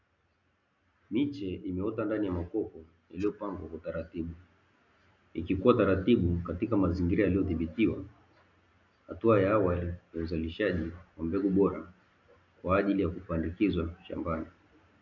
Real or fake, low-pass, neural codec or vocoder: real; 7.2 kHz; none